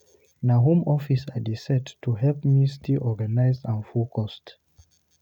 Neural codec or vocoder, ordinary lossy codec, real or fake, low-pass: none; none; real; 19.8 kHz